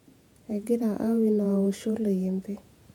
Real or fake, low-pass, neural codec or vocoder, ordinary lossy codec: fake; 19.8 kHz; vocoder, 48 kHz, 128 mel bands, Vocos; MP3, 96 kbps